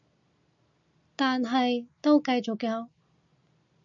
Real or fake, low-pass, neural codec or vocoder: real; 7.2 kHz; none